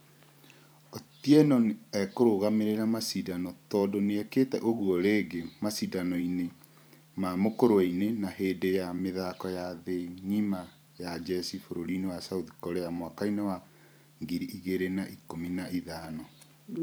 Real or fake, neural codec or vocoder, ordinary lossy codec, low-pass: fake; vocoder, 44.1 kHz, 128 mel bands every 512 samples, BigVGAN v2; none; none